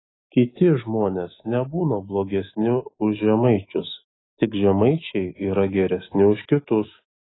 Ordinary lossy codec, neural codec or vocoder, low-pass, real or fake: AAC, 16 kbps; autoencoder, 48 kHz, 128 numbers a frame, DAC-VAE, trained on Japanese speech; 7.2 kHz; fake